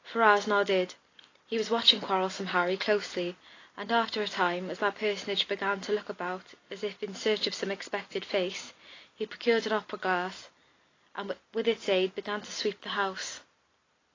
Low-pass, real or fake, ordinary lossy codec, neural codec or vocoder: 7.2 kHz; real; AAC, 32 kbps; none